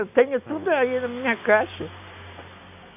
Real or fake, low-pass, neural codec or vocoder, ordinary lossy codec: real; 3.6 kHz; none; none